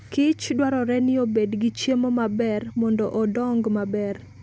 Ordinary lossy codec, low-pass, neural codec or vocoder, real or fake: none; none; none; real